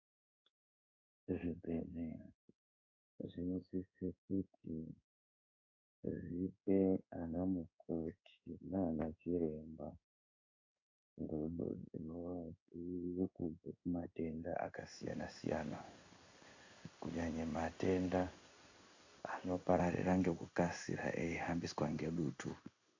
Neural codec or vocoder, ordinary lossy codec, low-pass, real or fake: codec, 16 kHz in and 24 kHz out, 1 kbps, XY-Tokenizer; AAC, 48 kbps; 7.2 kHz; fake